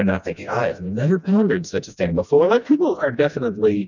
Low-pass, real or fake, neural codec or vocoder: 7.2 kHz; fake; codec, 16 kHz, 1 kbps, FreqCodec, smaller model